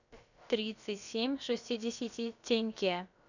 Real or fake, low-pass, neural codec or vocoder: fake; 7.2 kHz; codec, 16 kHz, about 1 kbps, DyCAST, with the encoder's durations